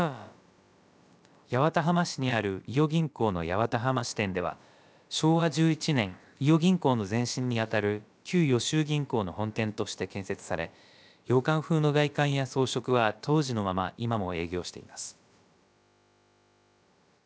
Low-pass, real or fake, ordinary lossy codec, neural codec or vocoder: none; fake; none; codec, 16 kHz, about 1 kbps, DyCAST, with the encoder's durations